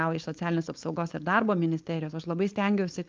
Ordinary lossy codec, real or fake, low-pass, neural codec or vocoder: Opus, 32 kbps; fake; 7.2 kHz; codec, 16 kHz, 4.8 kbps, FACodec